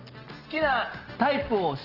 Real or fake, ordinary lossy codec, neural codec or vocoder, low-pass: real; Opus, 16 kbps; none; 5.4 kHz